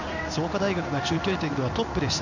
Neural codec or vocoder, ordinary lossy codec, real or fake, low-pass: codec, 16 kHz in and 24 kHz out, 1 kbps, XY-Tokenizer; none; fake; 7.2 kHz